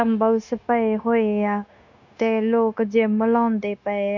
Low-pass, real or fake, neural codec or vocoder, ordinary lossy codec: 7.2 kHz; fake; codec, 16 kHz, 0.9 kbps, LongCat-Audio-Codec; none